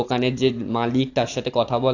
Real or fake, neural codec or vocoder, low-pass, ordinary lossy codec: real; none; 7.2 kHz; AAC, 48 kbps